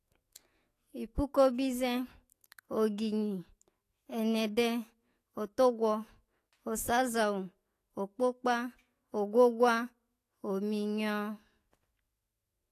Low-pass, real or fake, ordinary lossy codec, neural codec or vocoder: 14.4 kHz; fake; AAC, 48 kbps; autoencoder, 48 kHz, 128 numbers a frame, DAC-VAE, trained on Japanese speech